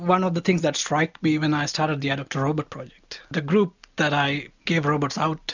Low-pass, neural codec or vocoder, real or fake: 7.2 kHz; none; real